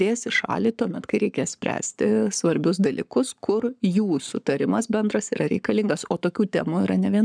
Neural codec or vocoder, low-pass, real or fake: codec, 44.1 kHz, 7.8 kbps, Pupu-Codec; 9.9 kHz; fake